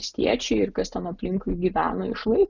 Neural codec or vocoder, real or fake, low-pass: none; real; 7.2 kHz